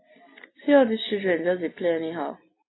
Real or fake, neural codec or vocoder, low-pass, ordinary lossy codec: real; none; 7.2 kHz; AAC, 16 kbps